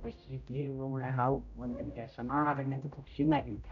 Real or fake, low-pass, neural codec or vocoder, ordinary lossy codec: fake; 7.2 kHz; codec, 16 kHz, 0.5 kbps, X-Codec, HuBERT features, trained on general audio; none